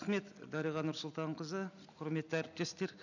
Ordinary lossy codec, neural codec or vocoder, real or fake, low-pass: none; none; real; 7.2 kHz